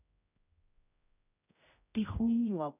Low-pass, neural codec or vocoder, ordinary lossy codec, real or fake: 3.6 kHz; codec, 16 kHz, 0.5 kbps, X-Codec, HuBERT features, trained on general audio; none; fake